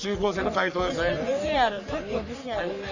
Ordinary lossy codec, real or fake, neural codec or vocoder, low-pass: none; fake; codec, 44.1 kHz, 3.4 kbps, Pupu-Codec; 7.2 kHz